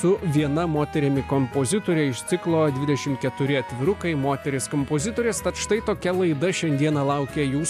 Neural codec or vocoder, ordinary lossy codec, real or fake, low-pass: none; AAC, 96 kbps; real; 14.4 kHz